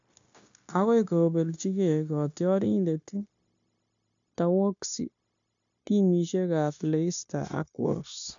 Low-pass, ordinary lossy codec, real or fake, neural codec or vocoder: 7.2 kHz; AAC, 64 kbps; fake; codec, 16 kHz, 0.9 kbps, LongCat-Audio-Codec